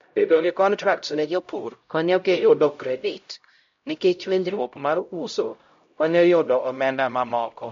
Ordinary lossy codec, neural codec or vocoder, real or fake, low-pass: MP3, 48 kbps; codec, 16 kHz, 0.5 kbps, X-Codec, HuBERT features, trained on LibriSpeech; fake; 7.2 kHz